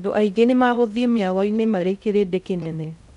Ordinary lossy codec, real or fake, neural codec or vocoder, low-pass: none; fake; codec, 16 kHz in and 24 kHz out, 0.6 kbps, FocalCodec, streaming, 2048 codes; 10.8 kHz